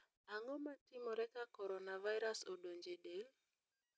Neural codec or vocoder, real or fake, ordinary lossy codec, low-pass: none; real; none; none